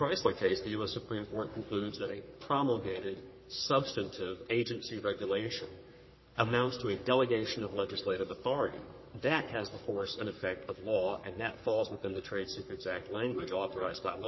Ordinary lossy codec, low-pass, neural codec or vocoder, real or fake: MP3, 24 kbps; 7.2 kHz; codec, 44.1 kHz, 3.4 kbps, Pupu-Codec; fake